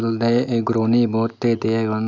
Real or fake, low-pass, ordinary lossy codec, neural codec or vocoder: real; 7.2 kHz; none; none